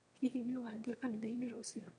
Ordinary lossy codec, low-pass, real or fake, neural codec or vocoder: none; 9.9 kHz; fake; autoencoder, 22.05 kHz, a latent of 192 numbers a frame, VITS, trained on one speaker